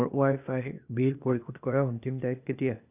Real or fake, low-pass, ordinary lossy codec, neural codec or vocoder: fake; 3.6 kHz; none; codec, 16 kHz, 0.8 kbps, ZipCodec